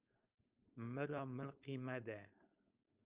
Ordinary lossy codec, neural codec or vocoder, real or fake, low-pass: Opus, 24 kbps; codec, 16 kHz, 4.8 kbps, FACodec; fake; 3.6 kHz